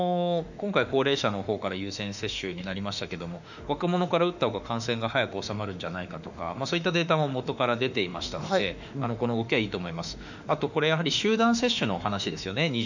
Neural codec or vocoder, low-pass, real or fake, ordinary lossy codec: autoencoder, 48 kHz, 32 numbers a frame, DAC-VAE, trained on Japanese speech; 7.2 kHz; fake; none